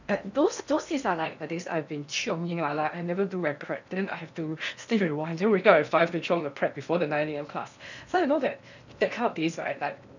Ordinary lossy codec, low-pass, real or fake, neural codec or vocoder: none; 7.2 kHz; fake; codec, 16 kHz in and 24 kHz out, 0.8 kbps, FocalCodec, streaming, 65536 codes